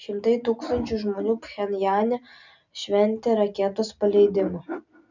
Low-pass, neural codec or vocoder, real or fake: 7.2 kHz; none; real